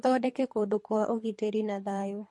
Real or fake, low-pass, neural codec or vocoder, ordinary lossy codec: fake; 10.8 kHz; codec, 24 kHz, 3 kbps, HILCodec; MP3, 48 kbps